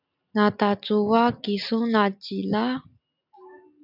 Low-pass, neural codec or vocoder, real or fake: 5.4 kHz; none; real